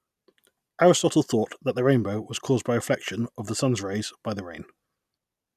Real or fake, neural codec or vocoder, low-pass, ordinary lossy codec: real; none; 14.4 kHz; none